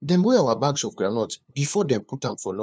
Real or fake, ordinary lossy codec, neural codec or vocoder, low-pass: fake; none; codec, 16 kHz, 2 kbps, FunCodec, trained on LibriTTS, 25 frames a second; none